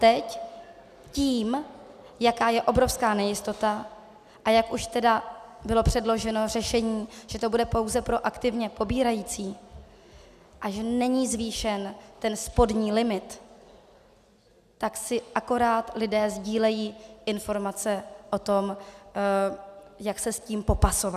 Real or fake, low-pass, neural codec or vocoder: real; 14.4 kHz; none